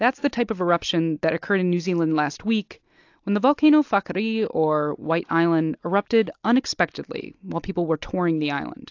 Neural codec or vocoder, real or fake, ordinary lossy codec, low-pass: none; real; AAC, 48 kbps; 7.2 kHz